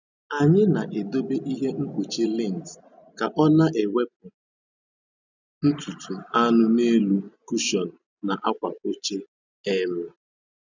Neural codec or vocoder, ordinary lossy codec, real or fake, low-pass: none; none; real; 7.2 kHz